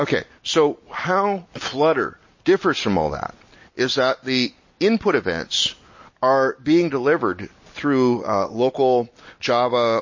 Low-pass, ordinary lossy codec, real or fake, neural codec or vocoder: 7.2 kHz; MP3, 32 kbps; real; none